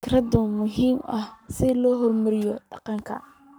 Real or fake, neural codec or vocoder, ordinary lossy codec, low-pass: fake; codec, 44.1 kHz, 7.8 kbps, Pupu-Codec; none; none